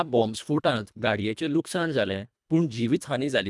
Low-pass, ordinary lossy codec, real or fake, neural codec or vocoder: none; none; fake; codec, 24 kHz, 1.5 kbps, HILCodec